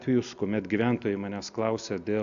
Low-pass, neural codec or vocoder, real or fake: 7.2 kHz; none; real